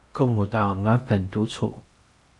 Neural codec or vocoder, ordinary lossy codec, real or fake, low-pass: codec, 16 kHz in and 24 kHz out, 0.8 kbps, FocalCodec, streaming, 65536 codes; AAC, 64 kbps; fake; 10.8 kHz